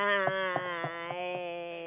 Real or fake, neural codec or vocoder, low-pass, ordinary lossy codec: real; none; 3.6 kHz; none